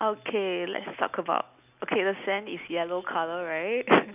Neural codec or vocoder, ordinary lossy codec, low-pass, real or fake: none; none; 3.6 kHz; real